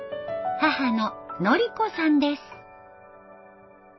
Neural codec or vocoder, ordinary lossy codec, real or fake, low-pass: none; MP3, 24 kbps; real; 7.2 kHz